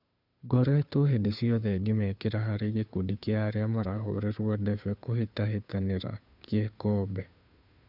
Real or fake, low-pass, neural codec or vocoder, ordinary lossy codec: fake; 5.4 kHz; codec, 16 kHz, 2 kbps, FunCodec, trained on Chinese and English, 25 frames a second; none